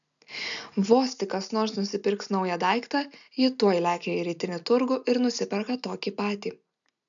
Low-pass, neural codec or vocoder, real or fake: 7.2 kHz; none; real